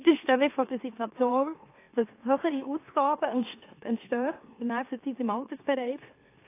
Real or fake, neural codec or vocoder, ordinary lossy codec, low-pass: fake; autoencoder, 44.1 kHz, a latent of 192 numbers a frame, MeloTTS; AAC, 24 kbps; 3.6 kHz